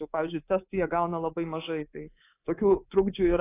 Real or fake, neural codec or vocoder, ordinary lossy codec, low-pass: fake; codec, 24 kHz, 3.1 kbps, DualCodec; AAC, 24 kbps; 3.6 kHz